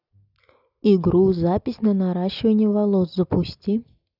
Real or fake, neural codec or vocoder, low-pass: real; none; 5.4 kHz